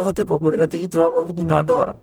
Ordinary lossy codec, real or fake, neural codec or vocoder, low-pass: none; fake; codec, 44.1 kHz, 0.9 kbps, DAC; none